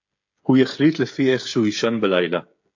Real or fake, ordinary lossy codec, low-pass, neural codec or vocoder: fake; AAC, 48 kbps; 7.2 kHz; codec, 16 kHz, 16 kbps, FreqCodec, smaller model